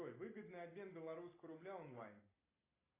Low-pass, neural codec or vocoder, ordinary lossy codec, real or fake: 3.6 kHz; none; AAC, 16 kbps; real